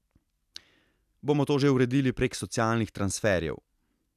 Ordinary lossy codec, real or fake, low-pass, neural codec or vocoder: none; real; 14.4 kHz; none